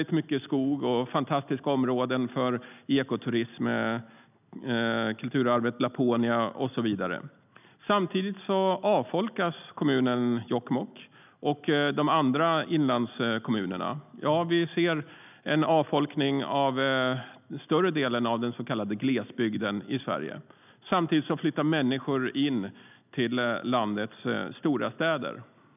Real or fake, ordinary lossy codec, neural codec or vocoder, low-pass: real; none; none; 3.6 kHz